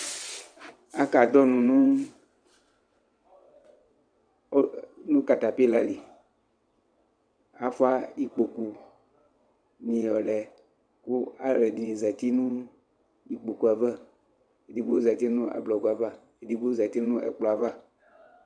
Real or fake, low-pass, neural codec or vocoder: fake; 9.9 kHz; vocoder, 22.05 kHz, 80 mel bands, WaveNeXt